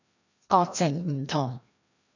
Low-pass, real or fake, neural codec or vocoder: 7.2 kHz; fake; codec, 16 kHz, 1 kbps, FreqCodec, larger model